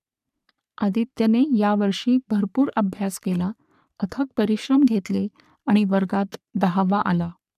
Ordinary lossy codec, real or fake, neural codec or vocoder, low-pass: none; fake; codec, 44.1 kHz, 3.4 kbps, Pupu-Codec; 14.4 kHz